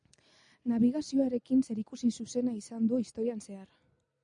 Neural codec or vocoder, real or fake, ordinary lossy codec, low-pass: none; real; MP3, 96 kbps; 9.9 kHz